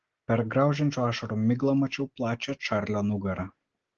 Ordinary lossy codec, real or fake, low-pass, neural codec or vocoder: Opus, 16 kbps; real; 7.2 kHz; none